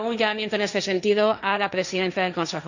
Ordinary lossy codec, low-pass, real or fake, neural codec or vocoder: none; 7.2 kHz; fake; codec, 16 kHz, 1.1 kbps, Voila-Tokenizer